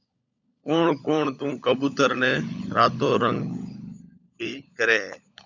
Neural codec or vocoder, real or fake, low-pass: codec, 16 kHz, 16 kbps, FunCodec, trained on LibriTTS, 50 frames a second; fake; 7.2 kHz